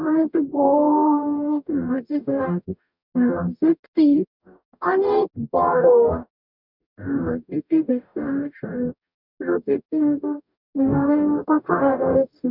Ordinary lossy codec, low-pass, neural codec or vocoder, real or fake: none; 5.4 kHz; codec, 44.1 kHz, 0.9 kbps, DAC; fake